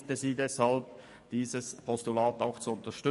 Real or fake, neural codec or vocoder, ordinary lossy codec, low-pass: fake; codec, 44.1 kHz, 7.8 kbps, DAC; MP3, 48 kbps; 14.4 kHz